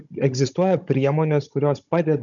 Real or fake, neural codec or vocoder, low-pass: fake; codec, 16 kHz, 16 kbps, FunCodec, trained on Chinese and English, 50 frames a second; 7.2 kHz